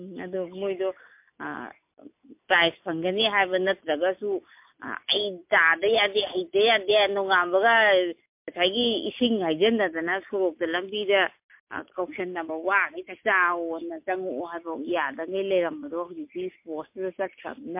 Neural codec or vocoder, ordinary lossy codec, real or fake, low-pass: none; MP3, 32 kbps; real; 3.6 kHz